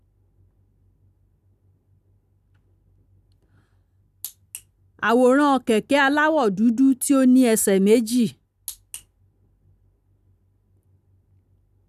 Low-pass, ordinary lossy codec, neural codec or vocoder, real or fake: 14.4 kHz; none; none; real